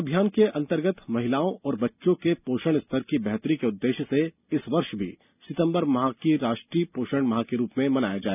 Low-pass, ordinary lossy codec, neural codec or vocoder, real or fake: 3.6 kHz; none; none; real